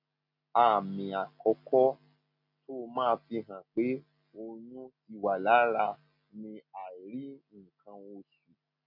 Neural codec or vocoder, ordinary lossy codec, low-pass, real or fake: none; none; 5.4 kHz; real